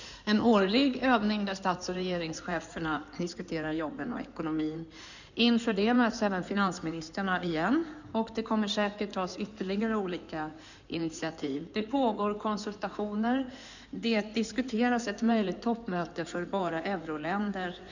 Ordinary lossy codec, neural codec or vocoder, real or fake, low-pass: none; codec, 16 kHz in and 24 kHz out, 2.2 kbps, FireRedTTS-2 codec; fake; 7.2 kHz